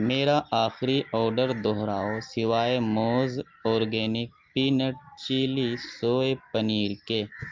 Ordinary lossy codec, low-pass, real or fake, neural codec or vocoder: Opus, 24 kbps; 7.2 kHz; real; none